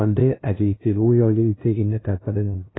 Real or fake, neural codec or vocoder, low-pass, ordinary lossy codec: fake; codec, 16 kHz, 0.5 kbps, FunCodec, trained on LibriTTS, 25 frames a second; 7.2 kHz; AAC, 16 kbps